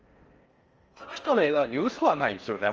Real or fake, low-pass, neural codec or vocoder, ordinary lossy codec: fake; 7.2 kHz; codec, 16 kHz in and 24 kHz out, 0.6 kbps, FocalCodec, streaming, 4096 codes; Opus, 24 kbps